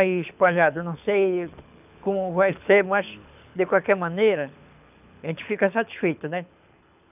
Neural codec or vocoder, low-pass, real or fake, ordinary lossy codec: codec, 24 kHz, 6 kbps, HILCodec; 3.6 kHz; fake; none